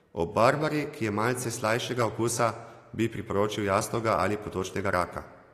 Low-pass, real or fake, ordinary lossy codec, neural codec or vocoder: 14.4 kHz; real; AAC, 48 kbps; none